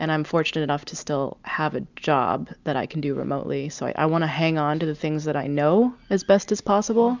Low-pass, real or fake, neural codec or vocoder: 7.2 kHz; real; none